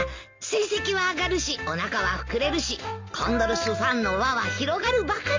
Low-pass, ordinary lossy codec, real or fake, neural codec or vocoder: 7.2 kHz; MP3, 48 kbps; real; none